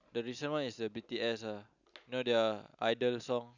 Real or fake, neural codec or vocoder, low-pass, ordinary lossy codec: real; none; 7.2 kHz; none